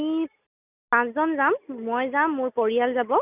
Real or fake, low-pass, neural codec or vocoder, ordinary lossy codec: real; 3.6 kHz; none; none